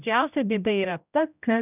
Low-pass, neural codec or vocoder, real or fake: 3.6 kHz; codec, 16 kHz, 0.5 kbps, X-Codec, HuBERT features, trained on general audio; fake